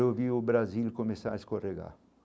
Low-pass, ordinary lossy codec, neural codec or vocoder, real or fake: none; none; none; real